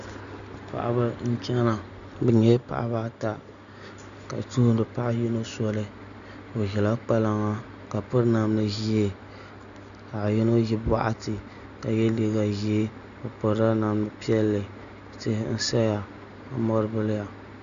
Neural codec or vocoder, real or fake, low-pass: none; real; 7.2 kHz